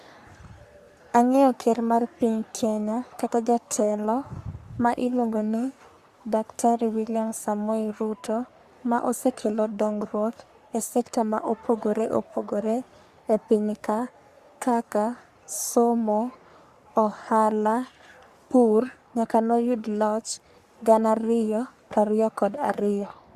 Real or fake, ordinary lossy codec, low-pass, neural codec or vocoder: fake; Opus, 64 kbps; 14.4 kHz; codec, 44.1 kHz, 3.4 kbps, Pupu-Codec